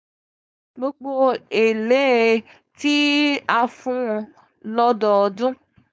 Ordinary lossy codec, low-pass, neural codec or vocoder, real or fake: none; none; codec, 16 kHz, 4.8 kbps, FACodec; fake